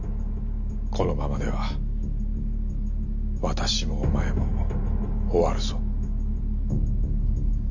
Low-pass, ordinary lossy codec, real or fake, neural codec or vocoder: 7.2 kHz; none; real; none